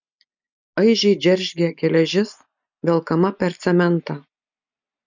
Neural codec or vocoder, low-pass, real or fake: none; 7.2 kHz; real